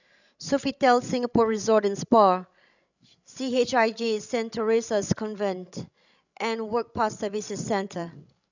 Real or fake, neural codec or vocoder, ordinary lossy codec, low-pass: fake; codec, 16 kHz, 16 kbps, FreqCodec, larger model; none; 7.2 kHz